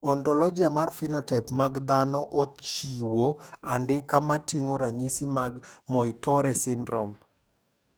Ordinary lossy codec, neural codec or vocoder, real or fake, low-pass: none; codec, 44.1 kHz, 2.6 kbps, DAC; fake; none